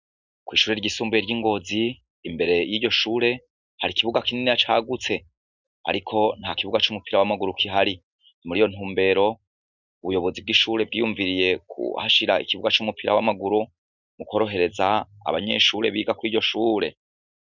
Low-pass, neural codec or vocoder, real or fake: 7.2 kHz; none; real